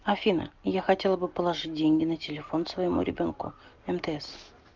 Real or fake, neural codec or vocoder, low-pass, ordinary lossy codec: real; none; 7.2 kHz; Opus, 24 kbps